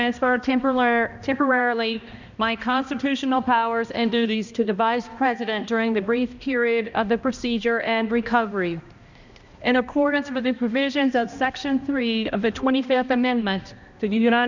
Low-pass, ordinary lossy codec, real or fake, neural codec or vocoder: 7.2 kHz; Opus, 64 kbps; fake; codec, 16 kHz, 1 kbps, X-Codec, HuBERT features, trained on balanced general audio